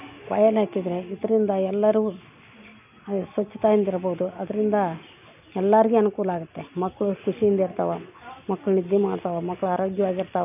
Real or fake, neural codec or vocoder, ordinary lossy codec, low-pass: real; none; none; 3.6 kHz